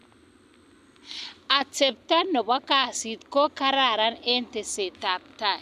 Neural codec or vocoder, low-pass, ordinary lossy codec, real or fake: none; 14.4 kHz; none; real